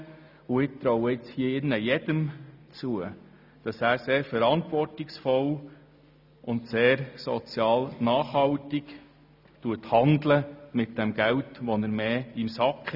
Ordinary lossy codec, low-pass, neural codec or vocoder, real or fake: none; 5.4 kHz; none; real